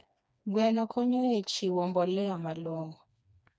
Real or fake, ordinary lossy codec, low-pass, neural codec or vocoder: fake; none; none; codec, 16 kHz, 2 kbps, FreqCodec, smaller model